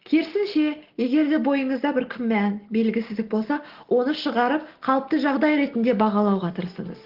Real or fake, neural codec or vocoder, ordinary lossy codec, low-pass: real; none; Opus, 16 kbps; 5.4 kHz